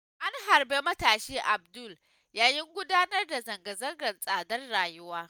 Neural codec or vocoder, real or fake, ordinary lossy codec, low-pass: none; real; none; none